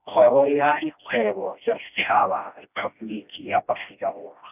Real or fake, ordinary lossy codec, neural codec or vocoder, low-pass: fake; none; codec, 16 kHz, 1 kbps, FreqCodec, smaller model; 3.6 kHz